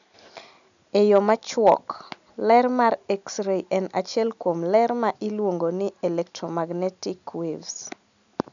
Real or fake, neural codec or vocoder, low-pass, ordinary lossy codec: real; none; 7.2 kHz; none